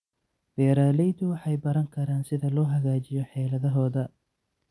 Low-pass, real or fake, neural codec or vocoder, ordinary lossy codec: none; real; none; none